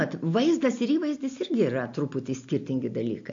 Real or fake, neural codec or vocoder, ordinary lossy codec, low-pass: real; none; MP3, 48 kbps; 7.2 kHz